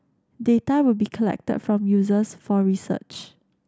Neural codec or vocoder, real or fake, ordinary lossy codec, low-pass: none; real; none; none